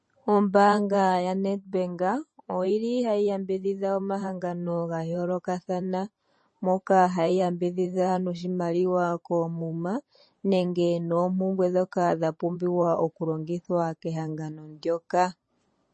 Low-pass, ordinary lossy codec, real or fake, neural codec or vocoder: 10.8 kHz; MP3, 32 kbps; fake; vocoder, 44.1 kHz, 128 mel bands every 512 samples, BigVGAN v2